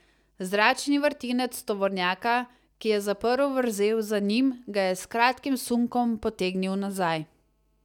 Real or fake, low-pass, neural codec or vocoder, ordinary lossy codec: real; 19.8 kHz; none; none